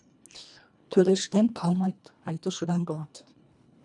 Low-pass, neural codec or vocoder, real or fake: 10.8 kHz; codec, 24 kHz, 1.5 kbps, HILCodec; fake